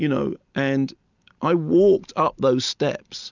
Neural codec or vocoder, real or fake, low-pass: none; real; 7.2 kHz